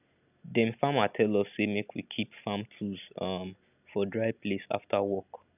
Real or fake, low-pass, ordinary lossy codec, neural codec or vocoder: real; 3.6 kHz; none; none